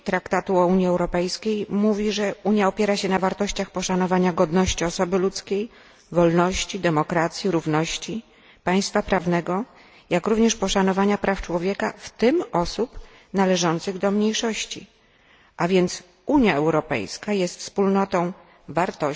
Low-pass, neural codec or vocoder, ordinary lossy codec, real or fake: none; none; none; real